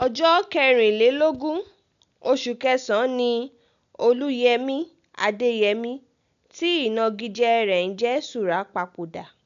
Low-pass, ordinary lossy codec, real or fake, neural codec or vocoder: 7.2 kHz; AAC, 96 kbps; real; none